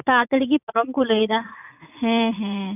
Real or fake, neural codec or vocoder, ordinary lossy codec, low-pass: fake; vocoder, 44.1 kHz, 80 mel bands, Vocos; none; 3.6 kHz